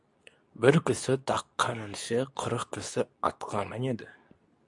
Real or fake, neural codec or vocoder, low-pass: fake; codec, 24 kHz, 0.9 kbps, WavTokenizer, medium speech release version 2; 10.8 kHz